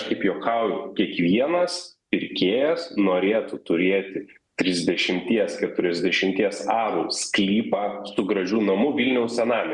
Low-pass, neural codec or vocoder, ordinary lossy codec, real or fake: 10.8 kHz; none; Opus, 64 kbps; real